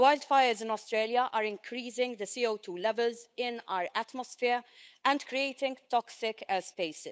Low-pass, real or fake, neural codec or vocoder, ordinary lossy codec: none; fake; codec, 16 kHz, 8 kbps, FunCodec, trained on Chinese and English, 25 frames a second; none